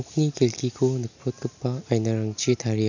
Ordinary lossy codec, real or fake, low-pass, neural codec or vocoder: none; real; 7.2 kHz; none